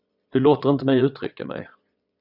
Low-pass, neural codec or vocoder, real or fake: 5.4 kHz; vocoder, 22.05 kHz, 80 mel bands, Vocos; fake